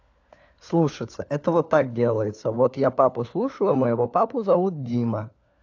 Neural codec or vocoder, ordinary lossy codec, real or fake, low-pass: codec, 16 kHz, 4 kbps, FunCodec, trained on LibriTTS, 50 frames a second; AAC, 48 kbps; fake; 7.2 kHz